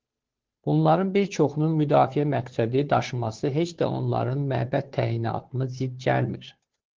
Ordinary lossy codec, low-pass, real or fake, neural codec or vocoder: Opus, 16 kbps; 7.2 kHz; fake; codec, 16 kHz, 2 kbps, FunCodec, trained on Chinese and English, 25 frames a second